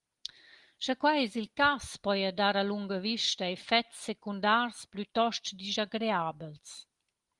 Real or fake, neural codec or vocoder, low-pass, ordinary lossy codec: real; none; 10.8 kHz; Opus, 24 kbps